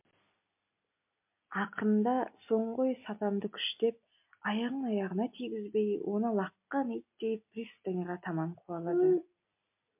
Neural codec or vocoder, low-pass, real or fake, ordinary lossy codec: none; 3.6 kHz; real; MP3, 32 kbps